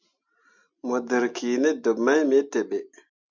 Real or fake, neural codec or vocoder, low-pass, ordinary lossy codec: real; none; 7.2 kHz; MP3, 64 kbps